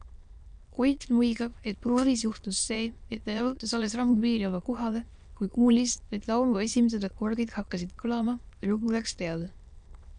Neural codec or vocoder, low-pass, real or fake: autoencoder, 22.05 kHz, a latent of 192 numbers a frame, VITS, trained on many speakers; 9.9 kHz; fake